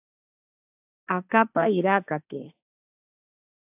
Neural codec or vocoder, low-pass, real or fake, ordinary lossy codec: codec, 16 kHz, 1.1 kbps, Voila-Tokenizer; 3.6 kHz; fake; AAC, 32 kbps